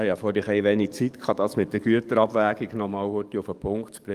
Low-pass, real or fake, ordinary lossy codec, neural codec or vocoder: 14.4 kHz; fake; Opus, 32 kbps; codec, 44.1 kHz, 7.8 kbps, DAC